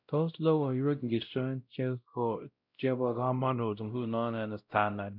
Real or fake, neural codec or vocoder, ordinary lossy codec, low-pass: fake; codec, 16 kHz, 0.5 kbps, X-Codec, WavLM features, trained on Multilingual LibriSpeech; none; 5.4 kHz